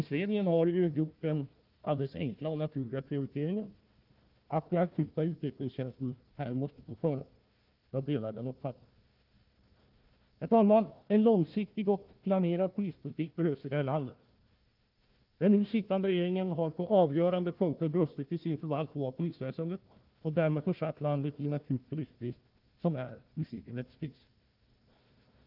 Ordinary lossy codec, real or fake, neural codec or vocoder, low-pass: Opus, 24 kbps; fake; codec, 16 kHz, 1 kbps, FunCodec, trained on Chinese and English, 50 frames a second; 5.4 kHz